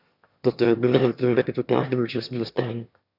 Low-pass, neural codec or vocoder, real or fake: 5.4 kHz; autoencoder, 22.05 kHz, a latent of 192 numbers a frame, VITS, trained on one speaker; fake